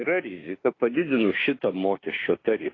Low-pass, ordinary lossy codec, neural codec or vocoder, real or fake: 7.2 kHz; AAC, 32 kbps; autoencoder, 48 kHz, 32 numbers a frame, DAC-VAE, trained on Japanese speech; fake